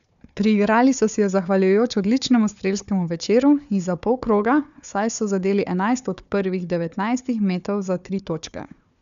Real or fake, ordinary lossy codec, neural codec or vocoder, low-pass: fake; none; codec, 16 kHz, 4 kbps, FunCodec, trained on Chinese and English, 50 frames a second; 7.2 kHz